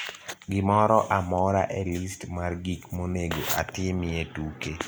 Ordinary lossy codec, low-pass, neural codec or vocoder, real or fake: none; none; none; real